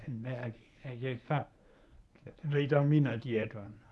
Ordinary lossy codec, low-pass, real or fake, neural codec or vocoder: none; none; fake; codec, 24 kHz, 0.9 kbps, WavTokenizer, medium speech release version 1